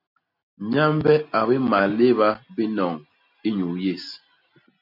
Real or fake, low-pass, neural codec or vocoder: real; 5.4 kHz; none